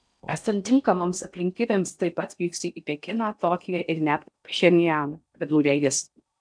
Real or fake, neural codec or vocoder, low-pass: fake; codec, 16 kHz in and 24 kHz out, 0.6 kbps, FocalCodec, streaming, 4096 codes; 9.9 kHz